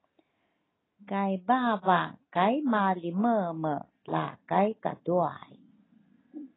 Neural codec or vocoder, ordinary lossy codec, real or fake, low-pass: none; AAC, 16 kbps; real; 7.2 kHz